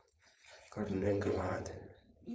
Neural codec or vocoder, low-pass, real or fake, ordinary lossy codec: codec, 16 kHz, 4.8 kbps, FACodec; none; fake; none